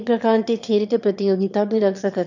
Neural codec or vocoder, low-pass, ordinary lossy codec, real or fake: autoencoder, 22.05 kHz, a latent of 192 numbers a frame, VITS, trained on one speaker; 7.2 kHz; none; fake